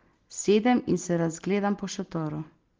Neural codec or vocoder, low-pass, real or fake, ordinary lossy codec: none; 7.2 kHz; real; Opus, 16 kbps